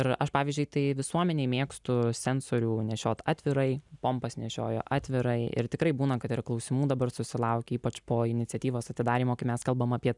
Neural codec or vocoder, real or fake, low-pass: none; real; 10.8 kHz